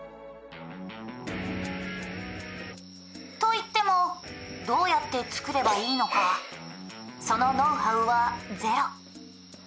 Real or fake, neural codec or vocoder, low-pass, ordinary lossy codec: real; none; none; none